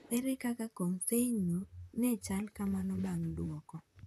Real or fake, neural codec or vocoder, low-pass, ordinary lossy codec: fake; vocoder, 44.1 kHz, 128 mel bands, Pupu-Vocoder; 14.4 kHz; none